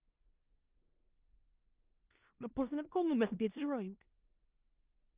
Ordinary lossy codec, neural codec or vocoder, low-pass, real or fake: Opus, 32 kbps; codec, 16 kHz in and 24 kHz out, 0.4 kbps, LongCat-Audio-Codec, four codebook decoder; 3.6 kHz; fake